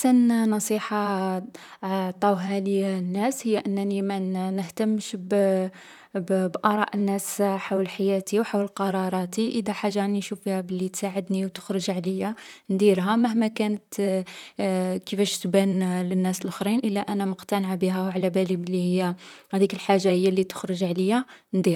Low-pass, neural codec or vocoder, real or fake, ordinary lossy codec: 19.8 kHz; vocoder, 44.1 kHz, 128 mel bands, Pupu-Vocoder; fake; none